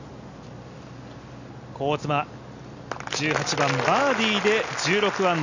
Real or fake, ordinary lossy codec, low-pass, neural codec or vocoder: real; none; 7.2 kHz; none